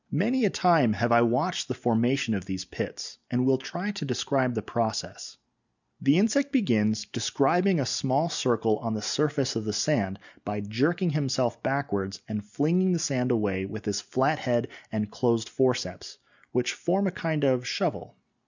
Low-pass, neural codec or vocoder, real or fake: 7.2 kHz; none; real